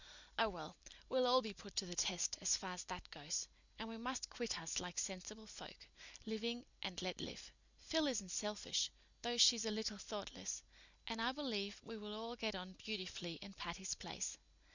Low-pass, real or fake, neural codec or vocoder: 7.2 kHz; real; none